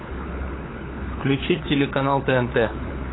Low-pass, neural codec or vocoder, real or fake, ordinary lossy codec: 7.2 kHz; codec, 16 kHz, 4 kbps, FunCodec, trained on LibriTTS, 50 frames a second; fake; AAC, 16 kbps